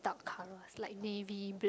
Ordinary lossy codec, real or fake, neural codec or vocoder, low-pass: none; real; none; none